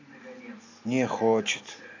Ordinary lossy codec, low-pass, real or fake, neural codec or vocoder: AAC, 48 kbps; 7.2 kHz; real; none